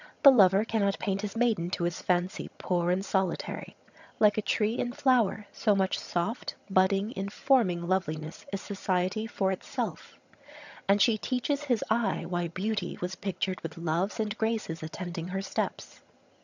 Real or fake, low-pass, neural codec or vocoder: fake; 7.2 kHz; vocoder, 22.05 kHz, 80 mel bands, HiFi-GAN